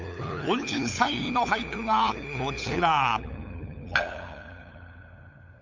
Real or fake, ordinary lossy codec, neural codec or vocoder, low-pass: fake; MP3, 64 kbps; codec, 16 kHz, 8 kbps, FunCodec, trained on LibriTTS, 25 frames a second; 7.2 kHz